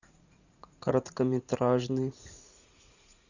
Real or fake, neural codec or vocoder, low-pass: fake; vocoder, 44.1 kHz, 80 mel bands, Vocos; 7.2 kHz